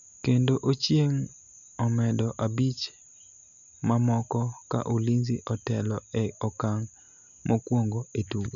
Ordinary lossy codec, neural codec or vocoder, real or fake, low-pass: none; none; real; 7.2 kHz